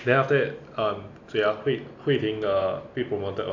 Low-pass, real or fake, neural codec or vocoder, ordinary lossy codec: 7.2 kHz; real; none; AAC, 48 kbps